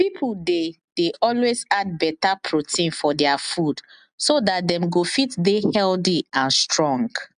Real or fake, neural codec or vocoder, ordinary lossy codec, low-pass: real; none; AAC, 96 kbps; 9.9 kHz